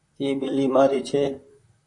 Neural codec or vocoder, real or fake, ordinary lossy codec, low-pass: vocoder, 44.1 kHz, 128 mel bands, Pupu-Vocoder; fake; AAC, 48 kbps; 10.8 kHz